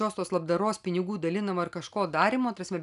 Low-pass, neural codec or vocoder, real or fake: 10.8 kHz; none; real